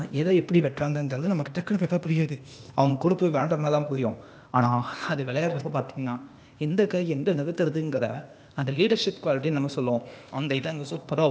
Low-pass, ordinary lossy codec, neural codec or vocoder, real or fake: none; none; codec, 16 kHz, 0.8 kbps, ZipCodec; fake